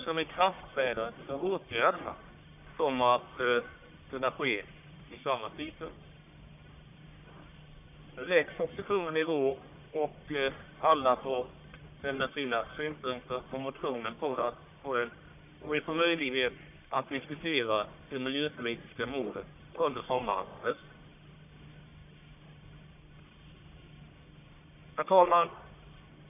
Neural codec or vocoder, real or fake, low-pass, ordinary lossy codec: codec, 44.1 kHz, 1.7 kbps, Pupu-Codec; fake; 3.6 kHz; none